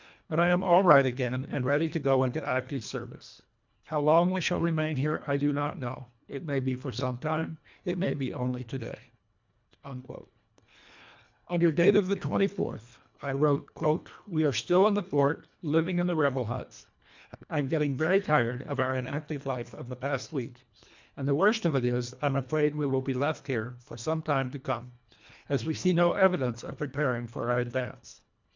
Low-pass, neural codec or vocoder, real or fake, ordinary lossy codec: 7.2 kHz; codec, 24 kHz, 1.5 kbps, HILCodec; fake; MP3, 64 kbps